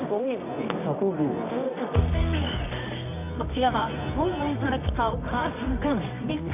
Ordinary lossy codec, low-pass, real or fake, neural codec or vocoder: none; 3.6 kHz; fake; codec, 24 kHz, 0.9 kbps, WavTokenizer, medium music audio release